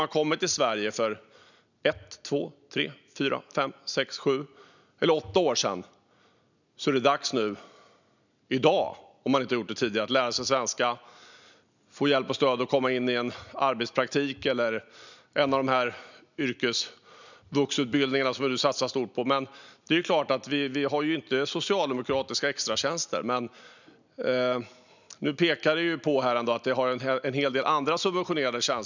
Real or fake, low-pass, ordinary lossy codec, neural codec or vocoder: real; 7.2 kHz; none; none